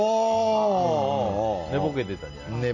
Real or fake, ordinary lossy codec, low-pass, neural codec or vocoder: real; none; 7.2 kHz; none